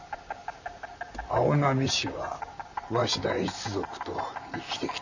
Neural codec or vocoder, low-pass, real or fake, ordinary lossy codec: vocoder, 44.1 kHz, 128 mel bands, Pupu-Vocoder; 7.2 kHz; fake; none